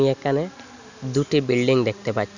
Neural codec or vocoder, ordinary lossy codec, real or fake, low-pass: none; none; real; 7.2 kHz